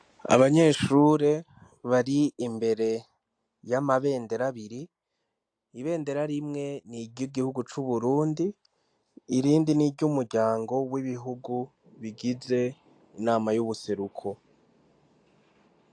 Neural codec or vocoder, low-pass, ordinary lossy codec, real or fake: none; 9.9 kHz; AAC, 64 kbps; real